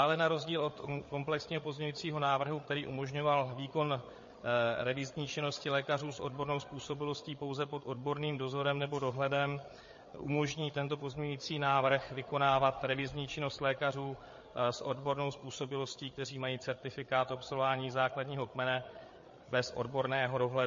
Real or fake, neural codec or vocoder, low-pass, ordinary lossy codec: fake; codec, 16 kHz, 8 kbps, FreqCodec, larger model; 7.2 kHz; MP3, 32 kbps